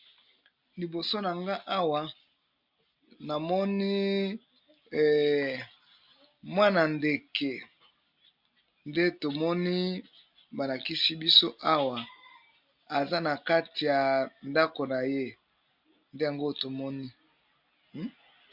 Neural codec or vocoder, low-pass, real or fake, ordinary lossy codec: none; 5.4 kHz; real; MP3, 48 kbps